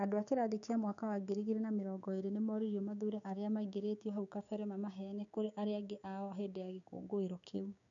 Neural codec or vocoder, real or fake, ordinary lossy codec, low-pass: codec, 16 kHz, 6 kbps, DAC; fake; none; 7.2 kHz